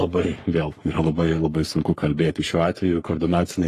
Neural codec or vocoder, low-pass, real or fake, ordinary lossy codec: codec, 44.1 kHz, 3.4 kbps, Pupu-Codec; 14.4 kHz; fake; AAC, 48 kbps